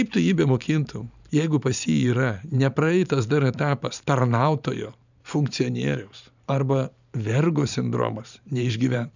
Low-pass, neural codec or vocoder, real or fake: 7.2 kHz; vocoder, 44.1 kHz, 128 mel bands every 512 samples, BigVGAN v2; fake